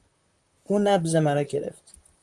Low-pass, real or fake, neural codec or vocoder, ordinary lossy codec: 10.8 kHz; fake; vocoder, 44.1 kHz, 128 mel bands, Pupu-Vocoder; Opus, 32 kbps